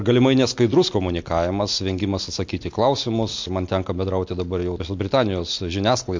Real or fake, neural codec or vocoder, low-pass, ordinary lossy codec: real; none; 7.2 kHz; MP3, 48 kbps